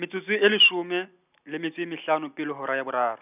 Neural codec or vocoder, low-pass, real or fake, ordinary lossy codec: none; 3.6 kHz; real; none